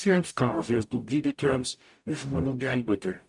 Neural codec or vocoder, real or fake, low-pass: codec, 44.1 kHz, 0.9 kbps, DAC; fake; 10.8 kHz